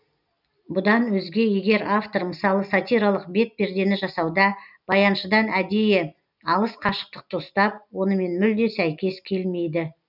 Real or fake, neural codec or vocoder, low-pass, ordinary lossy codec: real; none; 5.4 kHz; none